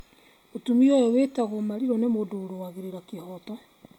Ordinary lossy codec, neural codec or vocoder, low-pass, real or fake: MP3, 96 kbps; none; 19.8 kHz; real